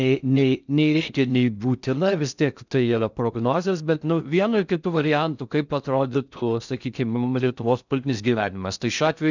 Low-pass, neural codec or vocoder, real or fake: 7.2 kHz; codec, 16 kHz in and 24 kHz out, 0.6 kbps, FocalCodec, streaming, 4096 codes; fake